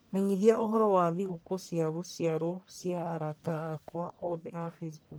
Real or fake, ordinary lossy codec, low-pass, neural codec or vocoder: fake; none; none; codec, 44.1 kHz, 1.7 kbps, Pupu-Codec